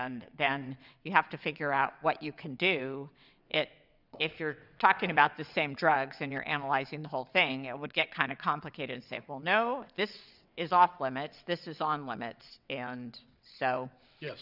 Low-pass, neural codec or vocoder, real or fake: 5.4 kHz; vocoder, 22.05 kHz, 80 mel bands, WaveNeXt; fake